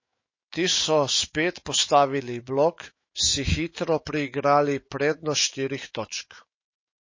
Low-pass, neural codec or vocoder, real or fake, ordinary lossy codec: 7.2 kHz; autoencoder, 48 kHz, 128 numbers a frame, DAC-VAE, trained on Japanese speech; fake; MP3, 32 kbps